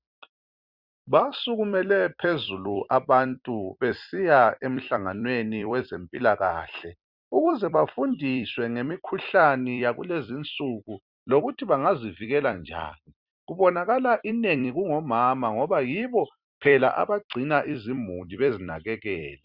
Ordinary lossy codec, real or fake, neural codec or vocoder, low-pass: Opus, 64 kbps; fake; vocoder, 44.1 kHz, 128 mel bands every 256 samples, BigVGAN v2; 5.4 kHz